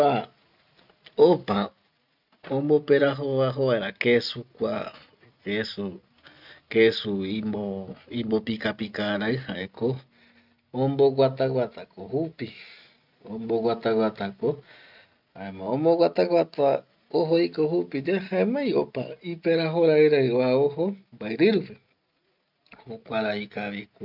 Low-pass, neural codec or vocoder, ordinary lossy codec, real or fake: 5.4 kHz; none; none; real